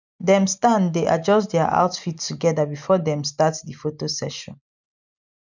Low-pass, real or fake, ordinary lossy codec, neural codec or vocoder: 7.2 kHz; real; none; none